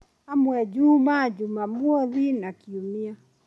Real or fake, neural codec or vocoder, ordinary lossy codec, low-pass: real; none; none; none